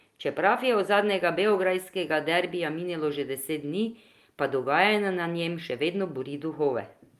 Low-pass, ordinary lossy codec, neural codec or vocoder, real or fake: 14.4 kHz; Opus, 32 kbps; none; real